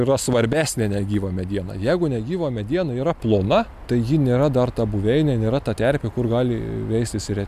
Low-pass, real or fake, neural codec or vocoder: 14.4 kHz; real; none